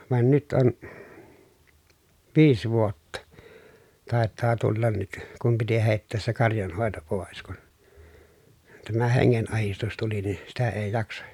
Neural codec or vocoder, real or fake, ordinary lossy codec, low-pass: none; real; none; 19.8 kHz